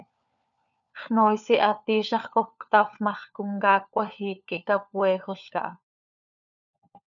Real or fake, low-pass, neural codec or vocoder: fake; 7.2 kHz; codec, 16 kHz, 4 kbps, FunCodec, trained on LibriTTS, 50 frames a second